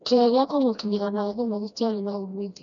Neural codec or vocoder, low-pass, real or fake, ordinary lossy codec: codec, 16 kHz, 1 kbps, FreqCodec, smaller model; 7.2 kHz; fake; none